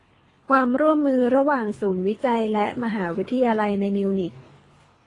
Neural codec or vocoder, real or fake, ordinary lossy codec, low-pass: codec, 24 kHz, 3 kbps, HILCodec; fake; AAC, 32 kbps; 10.8 kHz